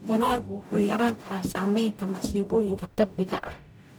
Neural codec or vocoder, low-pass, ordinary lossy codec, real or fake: codec, 44.1 kHz, 0.9 kbps, DAC; none; none; fake